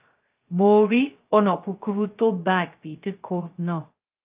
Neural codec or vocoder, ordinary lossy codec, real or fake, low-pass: codec, 16 kHz, 0.2 kbps, FocalCodec; Opus, 32 kbps; fake; 3.6 kHz